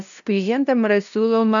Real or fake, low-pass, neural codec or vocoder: fake; 7.2 kHz; codec, 16 kHz, 0.5 kbps, FunCodec, trained on LibriTTS, 25 frames a second